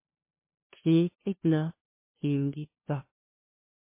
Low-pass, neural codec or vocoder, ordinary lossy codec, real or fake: 3.6 kHz; codec, 16 kHz, 0.5 kbps, FunCodec, trained on LibriTTS, 25 frames a second; MP3, 24 kbps; fake